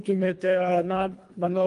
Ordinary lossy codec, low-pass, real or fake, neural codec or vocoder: Opus, 32 kbps; 10.8 kHz; fake; codec, 24 kHz, 1.5 kbps, HILCodec